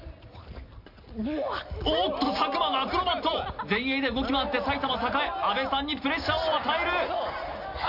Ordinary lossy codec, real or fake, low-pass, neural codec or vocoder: AAC, 48 kbps; real; 5.4 kHz; none